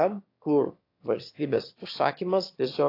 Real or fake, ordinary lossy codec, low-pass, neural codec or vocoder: fake; AAC, 32 kbps; 5.4 kHz; autoencoder, 22.05 kHz, a latent of 192 numbers a frame, VITS, trained on one speaker